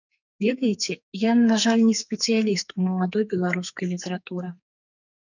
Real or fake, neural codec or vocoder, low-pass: fake; codec, 44.1 kHz, 2.6 kbps, SNAC; 7.2 kHz